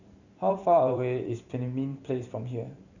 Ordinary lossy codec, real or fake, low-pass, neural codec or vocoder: none; fake; 7.2 kHz; vocoder, 44.1 kHz, 128 mel bands every 512 samples, BigVGAN v2